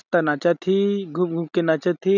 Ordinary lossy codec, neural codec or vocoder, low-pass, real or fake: none; none; 7.2 kHz; real